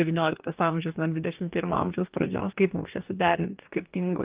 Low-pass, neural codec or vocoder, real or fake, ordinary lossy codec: 3.6 kHz; codec, 44.1 kHz, 2.6 kbps, DAC; fake; Opus, 24 kbps